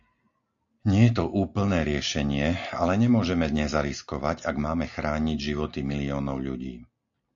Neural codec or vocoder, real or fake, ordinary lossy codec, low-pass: none; real; AAC, 64 kbps; 7.2 kHz